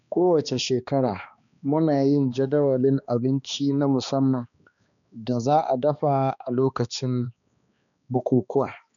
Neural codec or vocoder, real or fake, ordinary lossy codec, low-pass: codec, 16 kHz, 2 kbps, X-Codec, HuBERT features, trained on balanced general audio; fake; none; 7.2 kHz